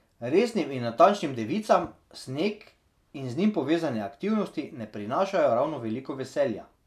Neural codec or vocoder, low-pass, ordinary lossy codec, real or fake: none; 14.4 kHz; none; real